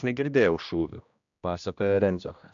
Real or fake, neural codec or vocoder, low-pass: fake; codec, 16 kHz, 1 kbps, X-Codec, HuBERT features, trained on general audio; 7.2 kHz